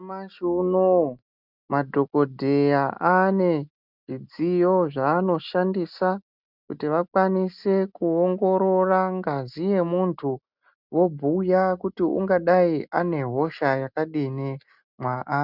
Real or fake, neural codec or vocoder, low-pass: real; none; 5.4 kHz